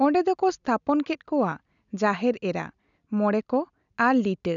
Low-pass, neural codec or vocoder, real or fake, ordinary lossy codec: 7.2 kHz; none; real; none